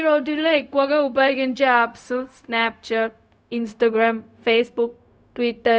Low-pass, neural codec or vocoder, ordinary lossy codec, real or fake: none; codec, 16 kHz, 0.4 kbps, LongCat-Audio-Codec; none; fake